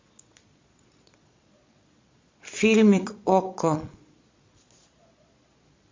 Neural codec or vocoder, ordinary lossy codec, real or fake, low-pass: vocoder, 22.05 kHz, 80 mel bands, Vocos; MP3, 48 kbps; fake; 7.2 kHz